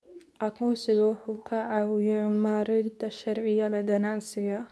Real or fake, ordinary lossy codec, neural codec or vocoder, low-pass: fake; none; codec, 24 kHz, 0.9 kbps, WavTokenizer, medium speech release version 2; none